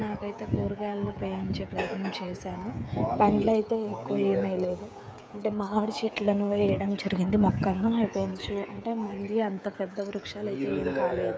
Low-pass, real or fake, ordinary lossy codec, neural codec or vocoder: none; fake; none; codec, 16 kHz, 16 kbps, FreqCodec, smaller model